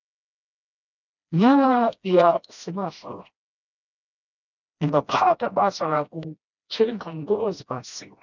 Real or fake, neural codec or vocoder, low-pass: fake; codec, 16 kHz, 1 kbps, FreqCodec, smaller model; 7.2 kHz